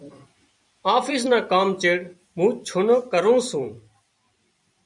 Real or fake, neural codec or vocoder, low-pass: fake; vocoder, 44.1 kHz, 128 mel bands every 256 samples, BigVGAN v2; 10.8 kHz